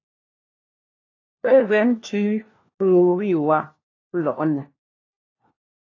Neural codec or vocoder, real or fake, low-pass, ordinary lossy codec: codec, 16 kHz, 1 kbps, FunCodec, trained on LibriTTS, 50 frames a second; fake; 7.2 kHz; AAC, 32 kbps